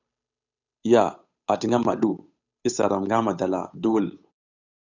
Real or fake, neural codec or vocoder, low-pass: fake; codec, 16 kHz, 8 kbps, FunCodec, trained on Chinese and English, 25 frames a second; 7.2 kHz